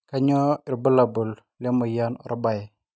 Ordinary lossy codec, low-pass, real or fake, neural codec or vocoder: none; none; real; none